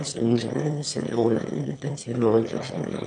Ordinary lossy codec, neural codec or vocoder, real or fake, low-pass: MP3, 64 kbps; autoencoder, 22.05 kHz, a latent of 192 numbers a frame, VITS, trained on one speaker; fake; 9.9 kHz